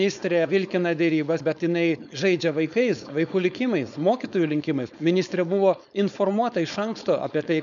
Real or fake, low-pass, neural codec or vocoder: fake; 7.2 kHz; codec, 16 kHz, 4.8 kbps, FACodec